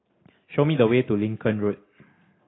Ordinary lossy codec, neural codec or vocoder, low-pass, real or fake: AAC, 16 kbps; none; 7.2 kHz; real